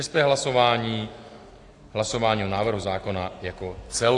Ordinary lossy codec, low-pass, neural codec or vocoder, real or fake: AAC, 48 kbps; 10.8 kHz; none; real